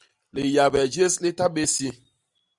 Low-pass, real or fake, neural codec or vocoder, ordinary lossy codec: 10.8 kHz; fake; vocoder, 44.1 kHz, 128 mel bands every 256 samples, BigVGAN v2; Opus, 64 kbps